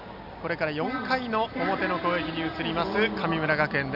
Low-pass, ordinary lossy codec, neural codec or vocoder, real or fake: 5.4 kHz; none; none; real